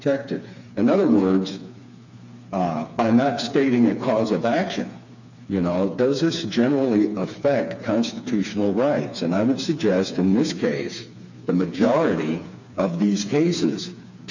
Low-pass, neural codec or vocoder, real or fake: 7.2 kHz; codec, 16 kHz, 4 kbps, FreqCodec, smaller model; fake